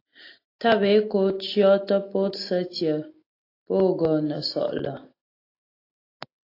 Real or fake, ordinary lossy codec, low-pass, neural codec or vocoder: real; AAC, 32 kbps; 5.4 kHz; none